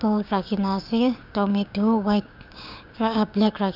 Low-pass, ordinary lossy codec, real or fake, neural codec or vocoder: 5.4 kHz; none; fake; codec, 16 kHz, 6 kbps, DAC